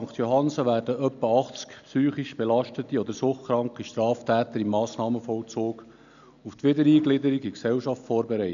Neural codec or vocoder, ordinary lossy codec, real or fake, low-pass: none; none; real; 7.2 kHz